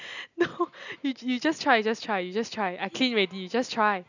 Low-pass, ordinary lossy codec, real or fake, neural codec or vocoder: 7.2 kHz; none; real; none